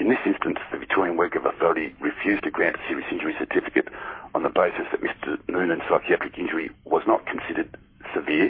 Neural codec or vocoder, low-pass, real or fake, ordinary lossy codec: codec, 44.1 kHz, 7.8 kbps, Pupu-Codec; 5.4 kHz; fake; MP3, 24 kbps